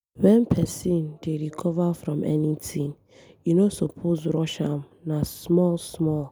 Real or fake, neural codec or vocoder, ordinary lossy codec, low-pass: real; none; none; none